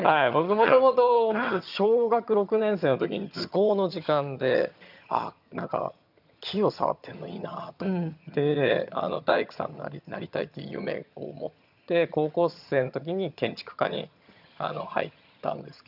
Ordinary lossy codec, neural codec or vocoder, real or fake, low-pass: none; vocoder, 22.05 kHz, 80 mel bands, HiFi-GAN; fake; 5.4 kHz